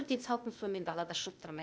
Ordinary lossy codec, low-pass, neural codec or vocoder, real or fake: none; none; codec, 16 kHz, 0.8 kbps, ZipCodec; fake